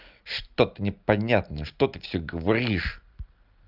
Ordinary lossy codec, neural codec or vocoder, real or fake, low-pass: Opus, 24 kbps; none; real; 5.4 kHz